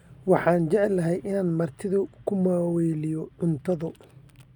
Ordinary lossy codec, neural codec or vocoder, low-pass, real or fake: none; none; 19.8 kHz; real